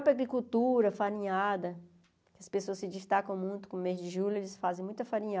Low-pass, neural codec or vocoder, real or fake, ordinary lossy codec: none; none; real; none